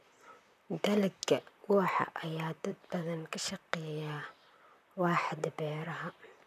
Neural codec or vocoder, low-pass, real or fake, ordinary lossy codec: vocoder, 44.1 kHz, 128 mel bands, Pupu-Vocoder; 14.4 kHz; fake; none